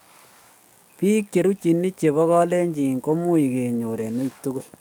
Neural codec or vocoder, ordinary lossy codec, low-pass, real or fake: codec, 44.1 kHz, 7.8 kbps, DAC; none; none; fake